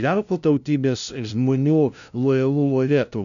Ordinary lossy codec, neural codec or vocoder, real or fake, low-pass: MP3, 96 kbps; codec, 16 kHz, 0.5 kbps, FunCodec, trained on LibriTTS, 25 frames a second; fake; 7.2 kHz